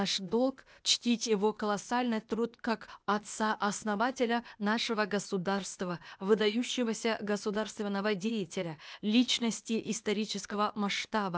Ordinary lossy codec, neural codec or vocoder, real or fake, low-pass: none; codec, 16 kHz, 0.8 kbps, ZipCodec; fake; none